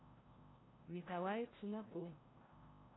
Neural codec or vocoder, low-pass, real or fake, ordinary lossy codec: codec, 16 kHz, 0.5 kbps, FreqCodec, larger model; 7.2 kHz; fake; AAC, 16 kbps